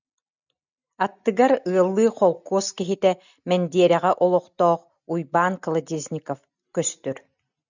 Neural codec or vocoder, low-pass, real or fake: none; 7.2 kHz; real